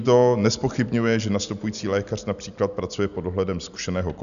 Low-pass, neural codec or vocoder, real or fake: 7.2 kHz; none; real